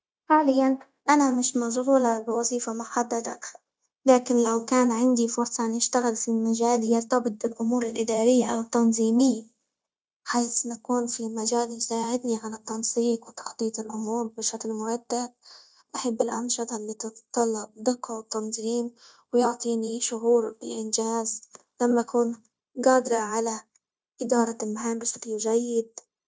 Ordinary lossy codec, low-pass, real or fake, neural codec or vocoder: none; none; fake; codec, 16 kHz, 0.9 kbps, LongCat-Audio-Codec